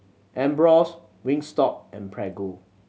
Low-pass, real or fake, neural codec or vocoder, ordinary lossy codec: none; real; none; none